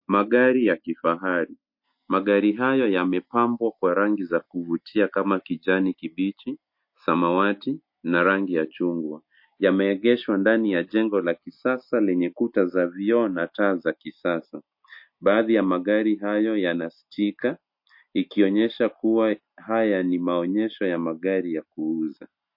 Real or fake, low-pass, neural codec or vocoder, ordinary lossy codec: real; 5.4 kHz; none; MP3, 32 kbps